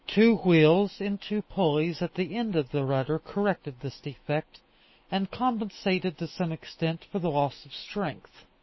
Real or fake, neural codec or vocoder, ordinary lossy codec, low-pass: fake; autoencoder, 48 kHz, 128 numbers a frame, DAC-VAE, trained on Japanese speech; MP3, 24 kbps; 7.2 kHz